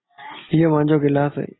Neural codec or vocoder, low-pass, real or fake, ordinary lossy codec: none; 7.2 kHz; real; AAC, 16 kbps